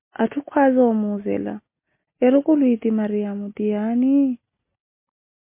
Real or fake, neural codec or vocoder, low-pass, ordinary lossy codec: real; none; 3.6 kHz; MP3, 24 kbps